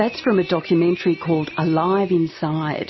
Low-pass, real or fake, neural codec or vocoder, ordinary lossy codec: 7.2 kHz; real; none; MP3, 24 kbps